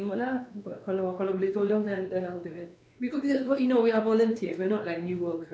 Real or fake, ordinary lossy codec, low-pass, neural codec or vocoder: fake; none; none; codec, 16 kHz, 2 kbps, X-Codec, WavLM features, trained on Multilingual LibriSpeech